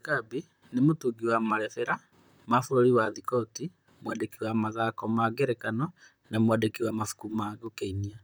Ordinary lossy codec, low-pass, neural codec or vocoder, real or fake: none; none; vocoder, 44.1 kHz, 128 mel bands, Pupu-Vocoder; fake